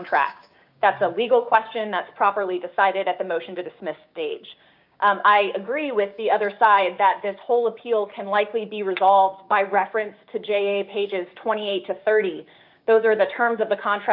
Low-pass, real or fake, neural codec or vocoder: 5.4 kHz; fake; codec, 16 kHz, 6 kbps, DAC